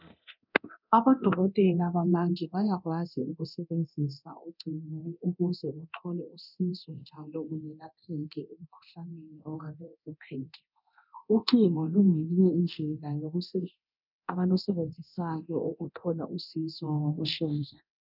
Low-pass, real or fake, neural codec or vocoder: 5.4 kHz; fake; codec, 24 kHz, 0.9 kbps, DualCodec